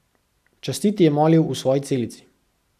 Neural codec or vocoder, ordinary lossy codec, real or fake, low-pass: none; none; real; 14.4 kHz